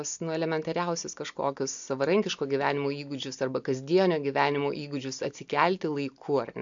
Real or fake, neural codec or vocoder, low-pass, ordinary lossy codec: real; none; 7.2 kHz; MP3, 64 kbps